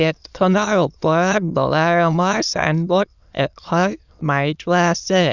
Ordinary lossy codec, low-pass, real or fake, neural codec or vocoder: none; 7.2 kHz; fake; autoencoder, 22.05 kHz, a latent of 192 numbers a frame, VITS, trained on many speakers